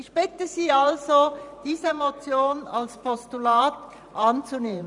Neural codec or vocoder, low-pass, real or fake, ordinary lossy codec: vocoder, 44.1 kHz, 128 mel bands every 512 samples, BigVGAN v2; 10.8 kHz; fake; none